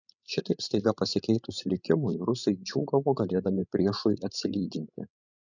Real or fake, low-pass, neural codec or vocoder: fake; 7.2 kHz; codec, 16 kHz, 16 kbps, FreqCodec, larger model